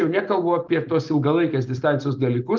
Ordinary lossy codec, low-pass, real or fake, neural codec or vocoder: Opus, 32 kbps; 7.2 kHz; real; none